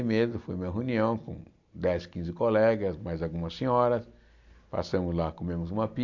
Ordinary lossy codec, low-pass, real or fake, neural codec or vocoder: MP3, 64 kbps; 7.2 kHz; real; none